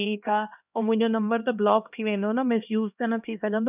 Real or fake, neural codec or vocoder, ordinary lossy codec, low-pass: fake; codec, 16 kHz, 1 kbps, X-Codec, HuBERT features, trained on LibriSpeech; none; 3.6 kHz